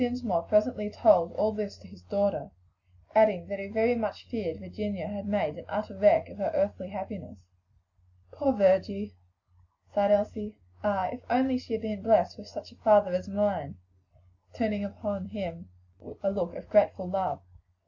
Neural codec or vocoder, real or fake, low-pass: none; real; 7.2 kHz